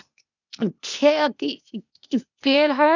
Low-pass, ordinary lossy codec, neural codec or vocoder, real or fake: 7.2 kHz; none; codec, 16 kHz, 0.8 kbps, ZipCodec; fake